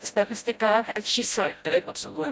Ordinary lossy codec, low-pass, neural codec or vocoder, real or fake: none; none; codec, 16 kHz, 0.5 kbps, FreqCodec, smaller model; fake